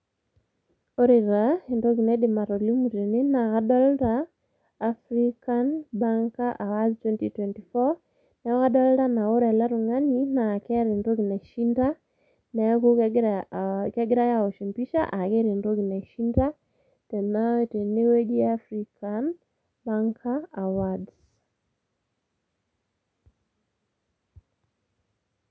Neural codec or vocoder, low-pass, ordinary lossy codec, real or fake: none; none; none; real